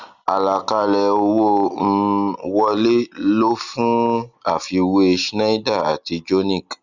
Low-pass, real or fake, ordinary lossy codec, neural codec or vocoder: 7.2 kHz; real; Opus, 64 kbps; none